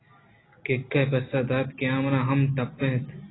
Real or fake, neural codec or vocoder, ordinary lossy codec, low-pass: real; none; AAC, 16 kbps; 7.2 kHz